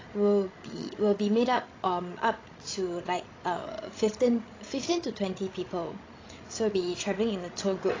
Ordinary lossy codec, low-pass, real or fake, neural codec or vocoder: AAC, 32 kbps; 7.2 kHz; fake; codec, 16 kHz, 16 kbps, FreqCodec, larger model